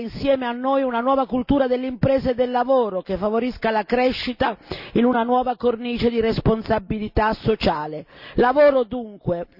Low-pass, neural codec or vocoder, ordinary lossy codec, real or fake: 5.4 kHz; none; none; real